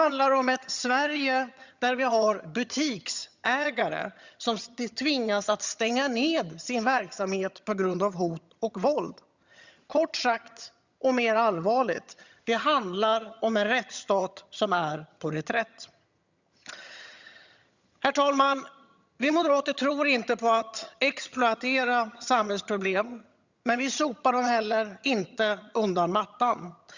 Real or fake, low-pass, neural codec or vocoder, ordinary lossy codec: fake; 7.2 kHz; vocoder, 22.05 kHz, 80 mel bands, HiFi-GAN; Opus, 64 kbps